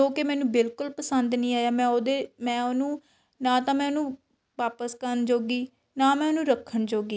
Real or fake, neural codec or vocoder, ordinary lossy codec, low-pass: real; none; none; none